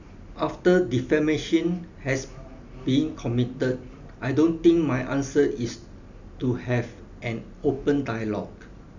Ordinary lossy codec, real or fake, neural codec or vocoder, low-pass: none; real; none; 7.2 kHz